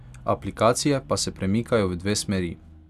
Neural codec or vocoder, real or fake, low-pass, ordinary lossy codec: none; real; 14.4 kHz; none